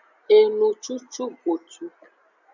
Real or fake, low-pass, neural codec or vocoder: real; 7.2 kHz; none